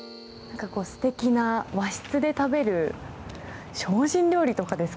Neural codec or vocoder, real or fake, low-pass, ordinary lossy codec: none; real; none; none